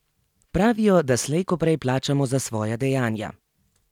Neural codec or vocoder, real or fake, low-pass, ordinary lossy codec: none; real; 19.8 kHz; none